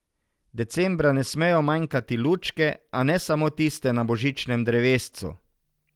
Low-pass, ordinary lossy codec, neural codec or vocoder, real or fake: 19.8 kHz; Opus, 24 kbps; none; real